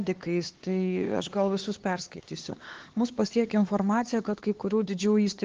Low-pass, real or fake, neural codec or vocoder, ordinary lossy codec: 7.2 kHz; fake; codec, 16 kHz, 4 kbps, X-Codec, HuBERT features, trained on LibriSpeech; Opus, 16 kbps